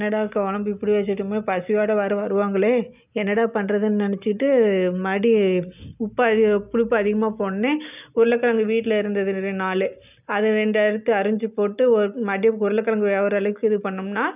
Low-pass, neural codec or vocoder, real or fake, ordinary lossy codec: 3.6 kHz; none; real; none